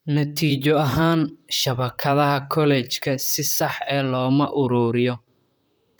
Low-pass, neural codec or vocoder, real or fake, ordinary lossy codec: none; vocoder, 44.1 kHz, 128 mel bands, Pupu-Vocoder; fake; none